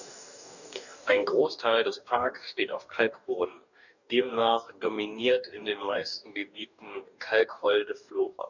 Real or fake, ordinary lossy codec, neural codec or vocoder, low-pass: fake; none; codec, 44.1 kHz, 2.6 kbps, DAC; 7.2 kHz